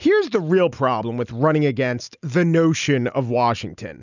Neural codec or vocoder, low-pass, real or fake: none; 7.2 kHz; real